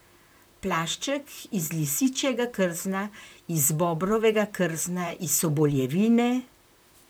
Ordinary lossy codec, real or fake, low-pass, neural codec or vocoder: none; fake; none; vocoder, 44.1 kHz, 128 mel bands, Pupu-Vocoder